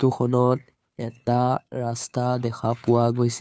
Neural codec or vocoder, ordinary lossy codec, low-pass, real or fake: codec, 16 kHz, 2 kbps, FunCodec, trained on Chinese and English, 25 frames a second; none; none; fake